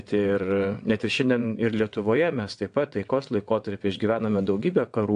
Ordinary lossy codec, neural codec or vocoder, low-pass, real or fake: AAC, 64 kbps; vocoder, 22.05 kHz, 80 mel bands, WaveNeXt; 9.9 kHz; fake